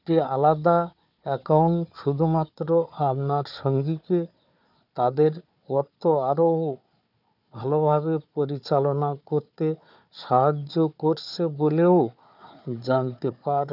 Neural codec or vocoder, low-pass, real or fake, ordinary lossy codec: codec, 16 kHz, 4 kbps, FunCodec, trained on Chinese and English, 50 frames a second; 5.4 kHz; fake; none